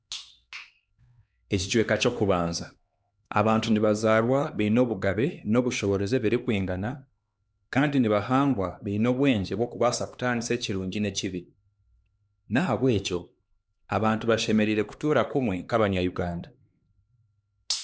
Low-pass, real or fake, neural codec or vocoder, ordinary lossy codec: none; fake; codec, 16 kHz, 2 kbps, X-Codec, HuBERT features, trained on LibriSpeech; none